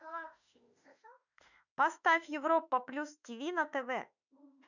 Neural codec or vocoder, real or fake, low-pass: autoencoder, 48 kHz, 32 numbers a frame, DAC-VAE, trained on Japanese speech; fake; 7.2 kHz